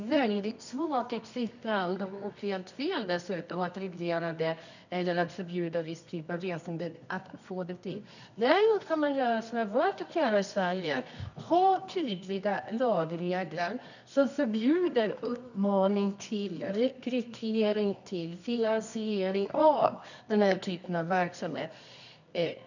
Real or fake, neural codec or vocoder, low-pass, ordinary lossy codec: fake; codec, 24 kHz, 0.9 kbps, WavTokenizer, medium music audio release; 7.2 kHz; none